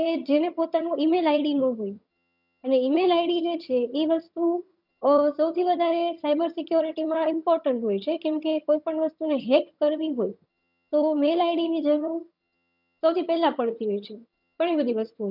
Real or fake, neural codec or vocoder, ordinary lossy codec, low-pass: fake; vocoder, 22.05 kHz, 80 mel bands, HiFi-GAN; none; 5.4 kHz